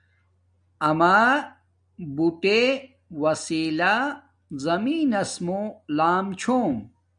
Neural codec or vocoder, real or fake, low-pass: none; real; 9.9 kHz